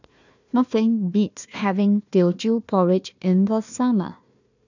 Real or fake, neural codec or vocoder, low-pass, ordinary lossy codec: fake; codec, 16 kHz, 1 kbps, FunCodec, trained on Chinese and English, 50 frames a second; 7.2 kHz; none